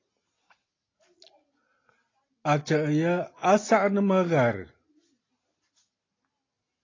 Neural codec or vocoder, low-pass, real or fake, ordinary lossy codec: none; 7.2 kHz; real; AAC, 32 kbps